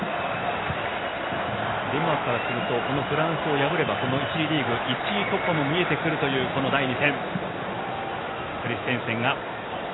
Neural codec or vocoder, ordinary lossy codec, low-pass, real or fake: none; AAC, 16 kbps; 7.2 kHz; real